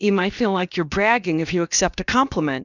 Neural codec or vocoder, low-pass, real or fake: codec, 16 kHz, about 1 kbps, DyCAST, with the encoder's durations; 7.2 kHz; fake